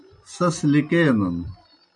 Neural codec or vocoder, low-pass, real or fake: none; 9.9 kHz; real